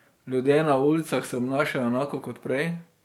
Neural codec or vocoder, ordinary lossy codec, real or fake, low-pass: codec, 44.1 kHz, 7.8 kbps, DAC; MP3, 96 kbps; fake; 19.8 kHz